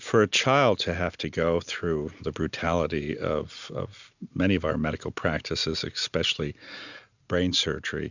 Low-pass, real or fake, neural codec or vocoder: 7.2 kHz; real; none